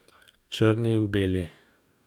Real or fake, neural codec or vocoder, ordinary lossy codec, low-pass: fake; codec, 44.1 kHz, 2.6 kbps, DAC; none; 19.8 kHz